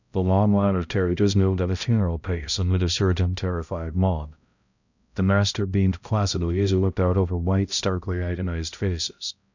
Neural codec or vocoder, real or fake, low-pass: codec, 16 kHz, 0.5 kbps, X-Codec, HuBERT features, trained on balanced general audio; fake; 7.2 kHz